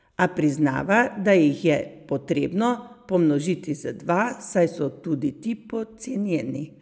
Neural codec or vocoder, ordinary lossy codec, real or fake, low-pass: none; none; real; none